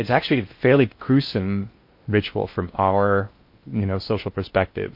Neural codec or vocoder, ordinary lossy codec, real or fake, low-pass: codec, 16 kHz in and 24 kHz out, 0.6 kbps, FocalCodec, streaming, 4096 codes; MP3, 32 kbps; fake; 5.4 kHz